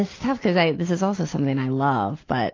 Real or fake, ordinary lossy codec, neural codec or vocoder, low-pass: real; AAC, 32 kbps; none; 7.2 kHz